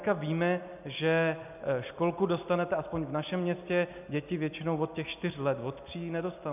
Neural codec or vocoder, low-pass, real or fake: none; 3.6 kHz; real